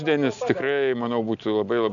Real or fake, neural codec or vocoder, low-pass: real; none; 7.2 kHz